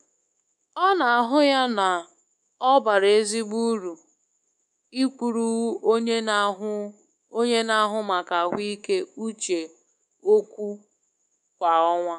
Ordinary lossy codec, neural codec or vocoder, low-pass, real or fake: none; codec, 24 kHz, 3.1 kbps, DualCodec; 10.8 kHz; fake